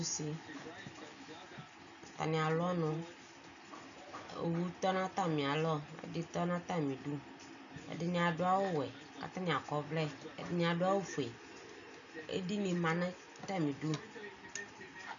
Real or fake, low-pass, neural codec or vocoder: real; 7.2 kHz; none